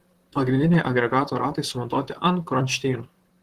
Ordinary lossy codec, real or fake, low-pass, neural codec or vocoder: Opus, 16 kbps; fake; 14.4 kHz; vocoder, 44.1 kHz, 128 mel bands, Pupu-Vocoder